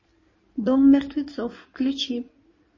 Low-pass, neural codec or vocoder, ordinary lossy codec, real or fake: 7.2 kHz; codec, 16 kHz in and 24 kHz out, 2.2 kbps, FireRedTTS-2 codec; MP3, 32 kbps; fake